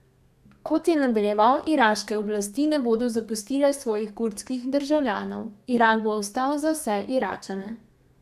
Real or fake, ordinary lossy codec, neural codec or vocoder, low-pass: fake; AAC, 96 kbps; codec, 32 kHz, 1.9 kbps, SNAC; 14.4 kHz